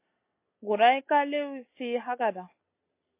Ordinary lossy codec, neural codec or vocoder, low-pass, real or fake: MP3, 24 kbps; none; 3.6 kHz; real